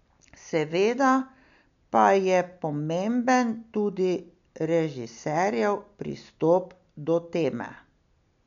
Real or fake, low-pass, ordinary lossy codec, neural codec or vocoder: real; 7.2 kHz; none; none